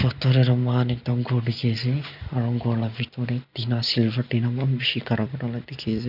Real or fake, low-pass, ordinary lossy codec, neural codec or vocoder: real; 5.4 kHz; none; none